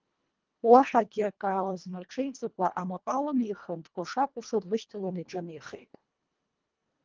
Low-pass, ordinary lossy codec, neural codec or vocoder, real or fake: 7.2 kHz; Opus, 24 kbps; codec, 24 kHz, 1.5 kbps, HILCodec; fake